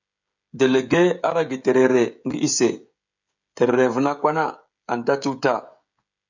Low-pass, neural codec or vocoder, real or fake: 7.2 kHz; codec, 16 kHz, 16 kbps, FreqCodec, smaller model; fake